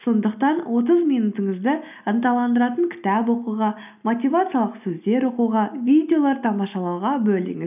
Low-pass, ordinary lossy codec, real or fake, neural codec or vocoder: 3.6 kHz; none; real; none